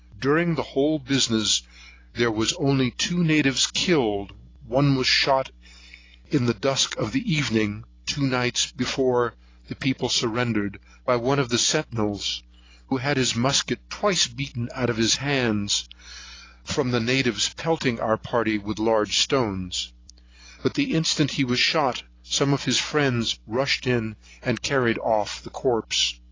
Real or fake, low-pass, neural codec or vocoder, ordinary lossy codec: real; 7.2 kHz; none; AAC, 32 kbps